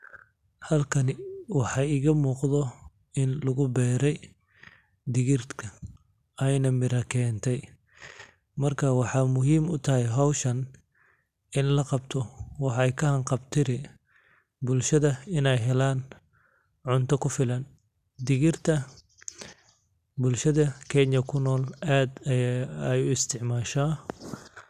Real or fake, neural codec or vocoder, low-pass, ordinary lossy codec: real; none; 14.4 kHz; none